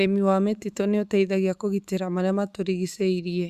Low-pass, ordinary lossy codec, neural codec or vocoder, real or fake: 14.4 kHz; Opus, 64 kbps; autoencoder, 48 kHz, 128 numbers a frame, DAC-VAE, trained on Japanese speech; fake